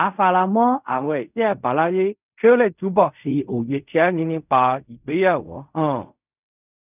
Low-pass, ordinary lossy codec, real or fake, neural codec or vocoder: 3.6 kHz; none; fake; codec, 16 kHz in and 24 kHz out, 0.4 kbps, LongCat-Audio-Codec, fine tuned four codebook decoder